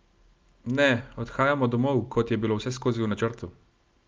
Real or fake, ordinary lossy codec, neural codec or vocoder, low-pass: real; Opus, 24 kbps; none; 7.2 kHz